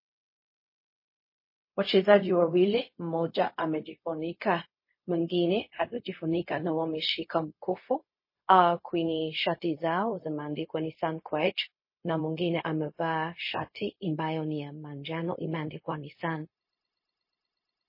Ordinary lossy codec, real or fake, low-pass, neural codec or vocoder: MP3, 24 kbps; fake; 5.4 kHz; codec, 16 kHz, 0.4 kbps, LongCat-Audio-Codec